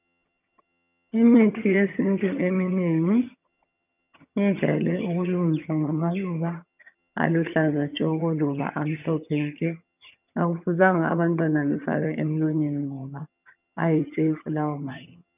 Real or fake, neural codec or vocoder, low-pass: fake; vocoder, 22.05 kHz, 80 mel bands, HiFi-GAN; 3.6 kHz